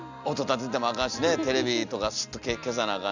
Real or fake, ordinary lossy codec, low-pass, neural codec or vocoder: real; none; 7.2 kHz; none